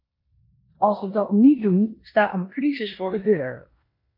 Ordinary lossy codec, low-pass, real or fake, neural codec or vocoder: MP3, 32 kbps; 5.4 kHz; fake; codec, 16 kHz in and 24 kHz out, 0.9 kbps, LongCat-Audio-Codec, four codebook decoder